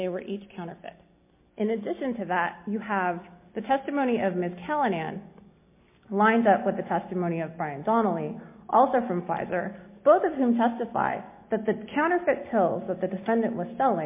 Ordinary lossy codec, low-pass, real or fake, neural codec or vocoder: MP3, 24 kbps; 3.6 kHz; real; none